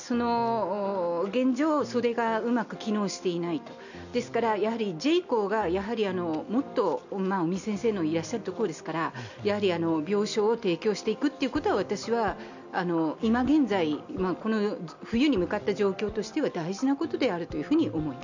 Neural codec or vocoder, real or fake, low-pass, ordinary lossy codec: none; real; 7.2 kHz; none